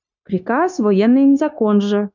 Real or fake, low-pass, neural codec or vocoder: fake; 7.2 kHz; codec, 16 kHz, 0.9 kbps, LongCat-Audio-Codec